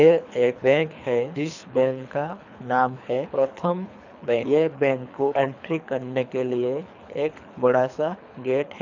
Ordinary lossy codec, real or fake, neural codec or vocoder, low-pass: none; fake; codec, 24 kHz, 3 kbps, HILCodec; 7.2 kHz